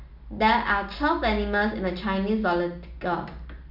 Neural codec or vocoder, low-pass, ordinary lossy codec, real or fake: none; 5.4 kHz; none; real